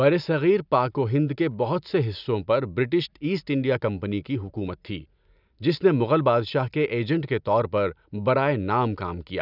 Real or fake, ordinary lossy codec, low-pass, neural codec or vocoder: real; none; 5.4 kHz; none